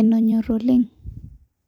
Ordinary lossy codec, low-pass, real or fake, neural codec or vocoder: none; 19.8 kHz; fake; vocoder, 44.1 kHz, 128 mel bands every 256 samples, BigVGAN v2